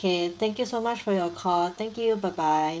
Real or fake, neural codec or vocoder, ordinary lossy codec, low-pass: fake; codec, 16 kHz, 16 kbps, FreqCodec, smaller model; none; none